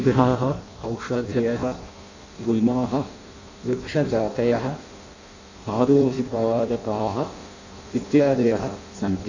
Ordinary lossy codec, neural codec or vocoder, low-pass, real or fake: AAC, 48 kbps; codec, 16 kHz in and 24 kHz out, 0.6 kbps, FireRedTTS-2 codec; 7.2 kHz; fake